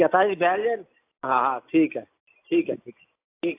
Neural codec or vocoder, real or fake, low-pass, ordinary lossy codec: none; real; 3.6 kHz; AAC, 24 kbps